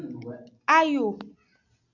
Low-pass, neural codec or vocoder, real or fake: 7.2 kHz; none; real